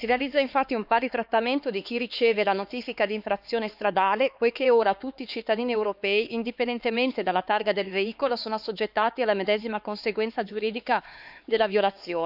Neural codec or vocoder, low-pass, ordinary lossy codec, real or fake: codec, 16 kHz, 4 kbps, X-Codec, HuBERT features, trained on LibriSpeech; 5.4 kHz; none; fake